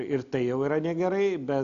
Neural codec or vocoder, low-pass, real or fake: none; 7.2 kHz; real